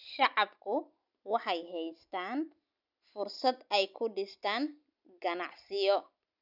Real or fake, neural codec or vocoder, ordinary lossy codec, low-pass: real; none; none; 5.4 kHz